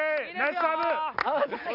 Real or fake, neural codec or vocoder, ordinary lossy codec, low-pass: real; none; none; 5.4 kHz